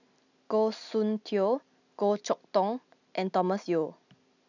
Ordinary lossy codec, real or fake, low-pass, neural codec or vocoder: none; real; 7.2 kHz; none